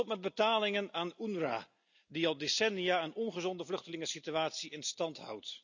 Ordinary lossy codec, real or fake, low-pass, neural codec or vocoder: none; real; 7.2 kHz; none